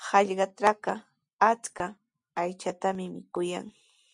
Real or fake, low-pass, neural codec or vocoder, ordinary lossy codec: real; 10.8 kHz; none; MP3, 64 kbps